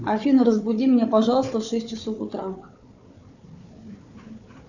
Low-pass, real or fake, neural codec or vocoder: 7.2 kHz; fake; codec, 16 kHz, 4 kbps, FunCodec, trained on Chinese and English, 50 frames a second